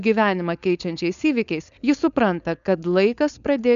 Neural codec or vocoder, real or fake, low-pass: codec, 16 kHz, 4.8 kbps, FACodec; fake; 7.2 kHz